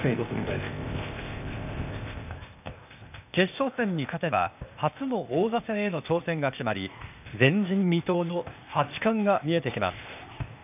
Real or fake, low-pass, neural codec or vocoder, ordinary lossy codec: fake; 3.6 kHz; codec, 16 kHz, 0.8 kbps, ZipCodec; none